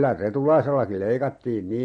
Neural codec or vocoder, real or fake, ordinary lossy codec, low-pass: none; real; MP3, 48 kbps; 19.8 kHz